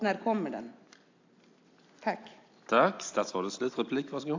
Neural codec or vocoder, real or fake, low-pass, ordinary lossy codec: none; real; 7.2 kHz; AAC, 48 kbps